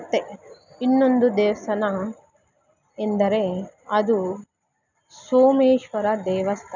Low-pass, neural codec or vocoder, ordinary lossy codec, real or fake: 7.2 kHz; none; none; real